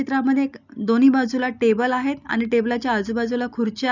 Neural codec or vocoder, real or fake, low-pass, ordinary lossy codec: none; real; 7.2 kHz; none